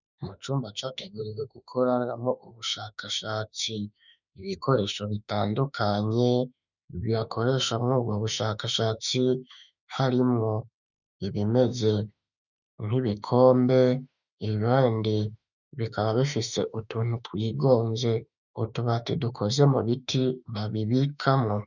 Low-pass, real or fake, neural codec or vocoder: 7.2 kHz; fake; autoencoder, 48 kHz, 32 numbers a frame, DAC-VAE, trained on Japanese speech